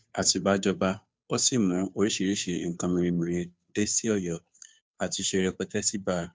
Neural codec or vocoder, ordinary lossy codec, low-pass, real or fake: codec, 16 kHz, 2 kbps, FunCodec, trained on Chinese and English, 25 frames a second; none; none; fake